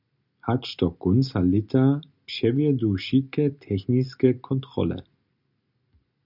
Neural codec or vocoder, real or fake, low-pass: none; real; 5.4 kHz